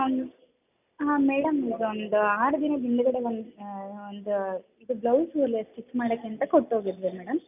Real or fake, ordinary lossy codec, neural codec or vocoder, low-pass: real; none; none; 3.6 kHz